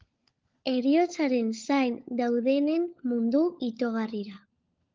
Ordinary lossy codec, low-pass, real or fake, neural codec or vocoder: Opus, 32 kbps; 7.2 kHz; fake; codec, 16 kHz, 16 kbps, FunCodec, trained on LibriTTS, 50 frames a second